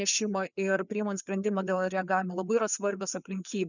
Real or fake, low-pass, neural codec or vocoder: fake; 7.2 kHz; codec, 16 kHz, 4 kbps, FreqCodec, larger model